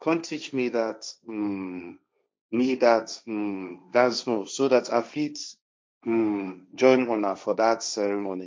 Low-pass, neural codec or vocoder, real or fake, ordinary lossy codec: none; codec, 16 kHz, 1.1 kbps, Voila-Tokenizer; fake; none